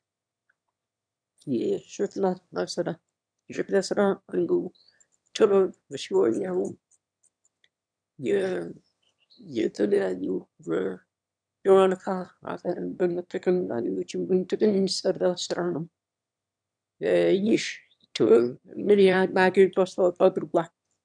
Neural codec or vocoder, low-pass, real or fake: autoencoder, 22.05 kHz, a latent of 192 numbers a frame, VITS, trained on one speaker; 9.9 kHz; fake